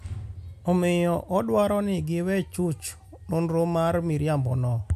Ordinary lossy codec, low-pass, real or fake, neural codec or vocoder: AAC, 96 kbps; 14.4 kHz; real; none